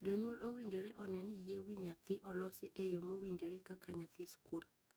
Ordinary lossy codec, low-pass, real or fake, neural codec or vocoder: none; none; fake; codec, 44.1 kHz, 2.6 kbps, DAC